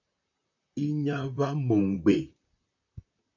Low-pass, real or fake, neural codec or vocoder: 7.2 kHz; fake; vocoder, 44.1 kHz, 128 mel bands, Pupu-Vocoder